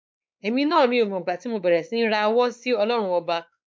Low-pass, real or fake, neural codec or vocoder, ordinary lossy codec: none; fake; codec, 16 kHz, 4 kbps, X-Codec, WavLM features, trained on Multilingual LibriSpeech; none